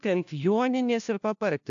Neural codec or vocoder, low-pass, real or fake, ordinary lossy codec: codec, 16 kHz, 1 kbps, FunCodec, trained on LibriTTS, 50 frames a second; 7.2 kHz; fake; AAC, 64 kbps